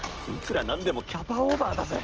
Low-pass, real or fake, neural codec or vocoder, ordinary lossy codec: 7.2 kHz; real; none; Opus, 16 kbps